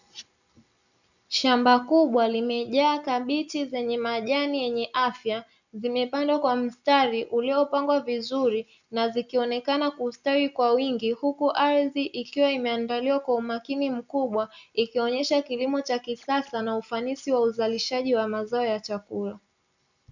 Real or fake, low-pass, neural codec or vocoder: real; 7.2 kHz; none